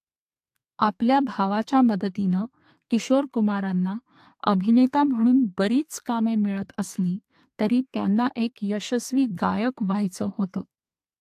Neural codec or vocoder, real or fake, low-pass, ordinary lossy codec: codec, 32 kHz, 1.9 kbps, SNAC; fake; 14.4 kHz; AAC, 64 kbps